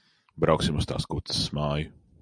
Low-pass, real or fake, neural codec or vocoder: 9.9 kHz; real; none